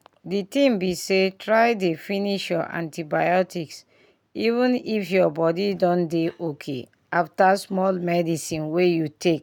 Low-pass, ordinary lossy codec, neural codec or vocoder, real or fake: 19.8 kHz; none; none; real